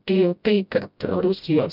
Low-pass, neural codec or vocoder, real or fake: 5.4 kHz; codec, 16 kHz, 0.5 kbps, FreqCodec, smaller model; fake